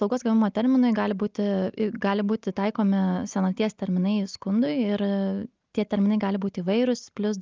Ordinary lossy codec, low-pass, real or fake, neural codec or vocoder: Opus, 24 kbps; 7.2 kHz; real; none